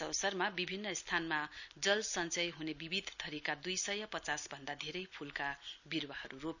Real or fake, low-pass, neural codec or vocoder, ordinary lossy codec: real; 7.2 kHz; none; none